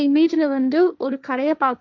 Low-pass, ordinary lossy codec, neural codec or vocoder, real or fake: none; none; codec, 16 kHz, 1.1 kbps, Voila-Tokenizer; fake